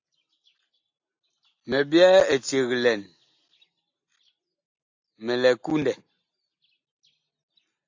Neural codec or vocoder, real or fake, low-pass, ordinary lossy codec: none; real; 7.2 kHz; AAC, 48 kbps